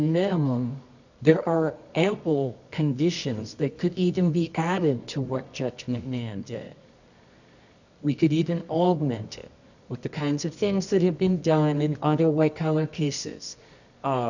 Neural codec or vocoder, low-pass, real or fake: codec, 24 kHz, 0.9 kbps, WavTokenizer, medium music audio release; 7.2 kHz; fake